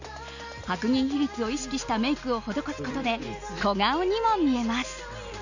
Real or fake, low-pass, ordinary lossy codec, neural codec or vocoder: real; 7.2 kHz; none; none